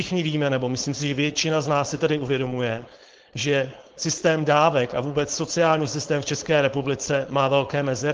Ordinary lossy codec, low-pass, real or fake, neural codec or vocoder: Opus, 16 kbps; 7.2 kHz; fake; codec, 16 kHz, 4.8 kbps, FACodec